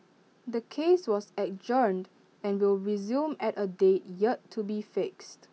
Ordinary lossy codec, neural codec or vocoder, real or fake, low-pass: none; none; real; none